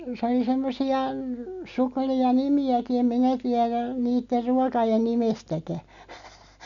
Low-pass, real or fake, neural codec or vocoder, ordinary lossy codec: 7.2 kHz; real; none; MP3, 96 kbps